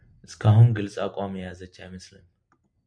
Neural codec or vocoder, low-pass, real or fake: none; 9.9 kHz; real